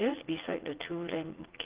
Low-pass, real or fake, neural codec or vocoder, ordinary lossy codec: 3.6 kHz; fake; vocoder, 44.1 kHz, 80 mel bands, Vocos; Opus, 16 kbps